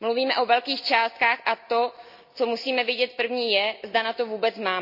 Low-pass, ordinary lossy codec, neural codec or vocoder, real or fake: 5.4 kHz; none; none; real